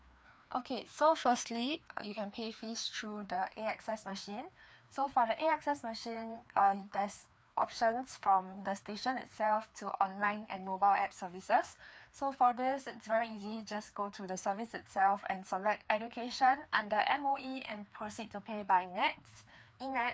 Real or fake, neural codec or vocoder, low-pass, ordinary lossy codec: fake; codec, 16 kHz, 2 kbps, FreqCodec, larger model; none; none